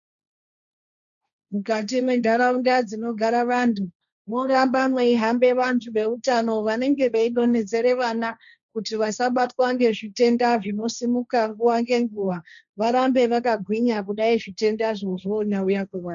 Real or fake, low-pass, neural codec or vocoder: fake; 7.2 kHz; codec, 16 kHz, 1.1 kbps, Voila-Tokenizer